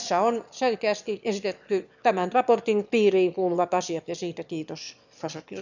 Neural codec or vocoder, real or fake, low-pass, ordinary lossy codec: autoencoder, 22.05 kHz, a latent of 192 numbers a frame, VITS, trained on one speaker; fake; 7.2 kHz; none